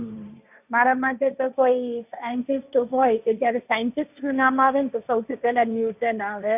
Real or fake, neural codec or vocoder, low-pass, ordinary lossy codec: fake; codec, 16 kHz, 1.1 kbps, Voila-Tokenizer; 3.6 kHz; none